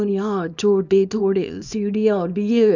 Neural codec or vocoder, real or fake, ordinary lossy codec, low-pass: codec, 24 kHz, 0.9 kbps, WavTokenizer, small release; fake; none; 7.2 kHz